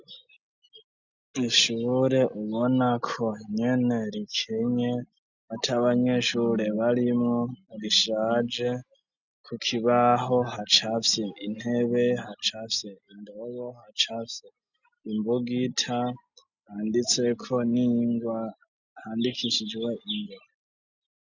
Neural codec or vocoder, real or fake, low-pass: none; real; 7.2 kHz